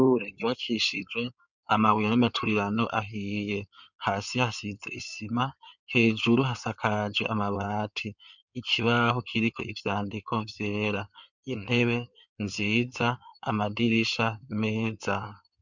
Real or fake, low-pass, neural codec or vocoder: fake; 7.2 kHz; codec, 16 kHz in and 24 kHz out, 2.2 kbps, FireRedTTS-2 codec